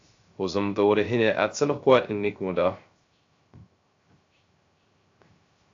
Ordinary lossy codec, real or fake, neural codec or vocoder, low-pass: AAC, 48 kbps; fake; codec, 16 kHz, 0.3 kbps, FocalCodec; 7.2 kHz